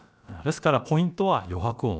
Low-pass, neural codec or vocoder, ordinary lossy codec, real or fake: none; codec, 16 kHz, about 1 kbps, DyCAST, with the encoder's durations; none; fake